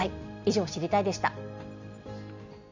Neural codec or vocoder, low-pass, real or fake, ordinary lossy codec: none; 7.2 kHz; real; MP3, 64 kbps